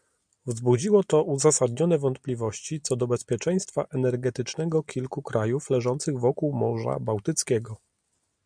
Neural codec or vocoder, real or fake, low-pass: none; real; 9.9 kHz